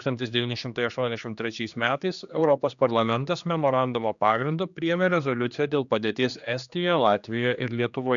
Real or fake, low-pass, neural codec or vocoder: fake; 7.2 kHz; codec, 16 kHz, 2 kbps, X-Codec, HuBERT features, trained on general audio